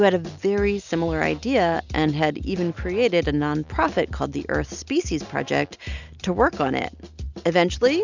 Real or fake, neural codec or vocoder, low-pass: real; none; 7.2 kHz